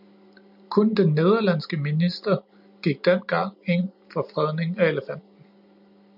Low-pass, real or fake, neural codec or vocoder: 5.4 kHz; real; none